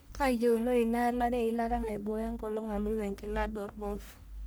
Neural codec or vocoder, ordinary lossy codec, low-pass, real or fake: codec, 44.1 kHz, 1.7 kbps, Pupu-Codec; none; none; fake